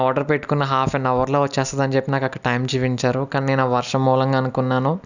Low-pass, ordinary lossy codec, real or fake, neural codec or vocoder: 7.2 kHz; none; real; none